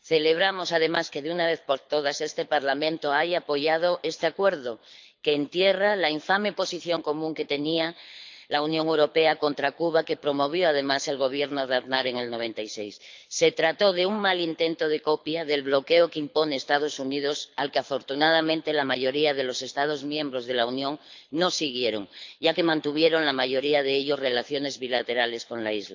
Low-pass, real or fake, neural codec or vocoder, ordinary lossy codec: 7.2 kHz; fake; codec, 24 kHz, 6 kbps, HILCodec; MP3, 64 kbps